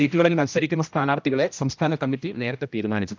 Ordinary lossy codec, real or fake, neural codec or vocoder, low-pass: none; fake; codec, 16 kHz, 1 kbps, X-Codec, HuBERT features, trained on general audio; none